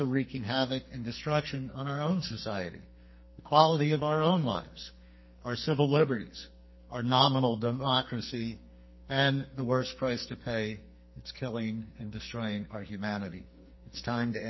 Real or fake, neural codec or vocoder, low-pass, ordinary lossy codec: fake; codec, 44.1 kHz, 2.6 kbps, SNAC; 7.2 kHz; MP3, 24 kbps